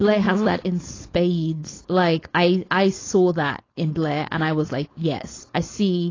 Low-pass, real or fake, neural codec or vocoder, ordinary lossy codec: 7.2 kHz; fake; codec, 16 kHz, 4.8 kbps, FACodec; AAC, 32 kbps